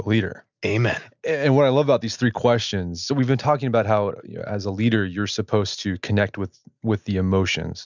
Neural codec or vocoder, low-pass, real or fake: none; 7.2 kHz; real